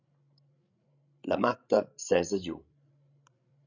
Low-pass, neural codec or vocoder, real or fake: 7.2 kHz; codec, 16 kHz, 16 kbps, FreqCodec, larger model; fake